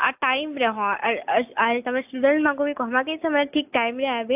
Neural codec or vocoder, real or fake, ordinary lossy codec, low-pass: none; real; AAC, 32 kbps; 3.6 kHz